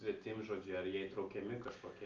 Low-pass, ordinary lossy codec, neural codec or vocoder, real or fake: 7.2 kHz; Opus, 32 kbps; none; real